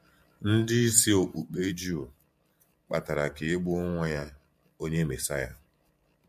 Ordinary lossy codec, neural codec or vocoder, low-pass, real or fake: MP3, 64 kbps; vocoder, 44.1 kHz, 128 mel bands every 512 samples, BigVGAN v2; 14.4 kHz; fake